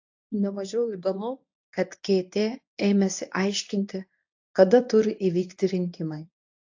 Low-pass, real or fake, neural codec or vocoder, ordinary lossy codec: 7.2 kHz; fake; codec, 24 kHz, 0.9 kbps, WavTokenizer, medium speech release version 1; AAC, 48 kbps